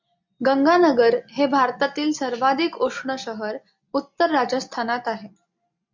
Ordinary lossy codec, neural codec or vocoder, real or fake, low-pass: MP3, 64 kbps; none; real; 7.2 kHz